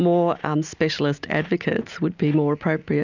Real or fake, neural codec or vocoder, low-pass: real; none; 7.2 kHz